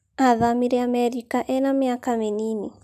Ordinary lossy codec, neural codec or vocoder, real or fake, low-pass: none; none; real; 14.4 kHz